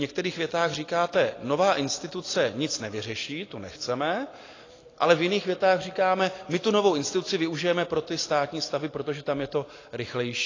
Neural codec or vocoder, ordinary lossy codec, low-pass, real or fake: none; AAC, 32 kbps; 7.2 kHz; real